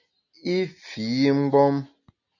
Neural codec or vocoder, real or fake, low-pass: none; real; 7.2 kHz